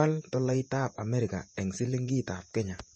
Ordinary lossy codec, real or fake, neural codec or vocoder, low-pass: MP3, 32 kbps; fake; vocoder, 48 kHz, 128 mel bands, Vocos; 9.9 kHz